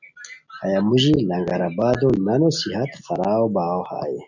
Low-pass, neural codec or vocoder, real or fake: 7.2 kHz; none; real